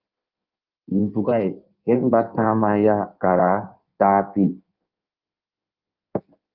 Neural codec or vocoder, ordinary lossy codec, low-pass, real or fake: codec, 16 kHz in and 24 kHz out, 1.1 kbps, FireRedTTS-2 codec; Opus, 32 kbps; 5.4 kHz; fake